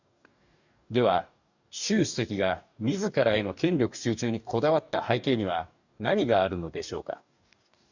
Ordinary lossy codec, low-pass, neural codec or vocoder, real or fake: none; 7.2 kHz; codec, 44.1 kHz, 2.6 kbps, DAC; fake